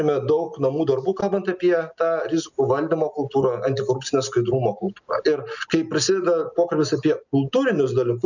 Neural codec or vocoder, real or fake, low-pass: none; real; 7.2 kHz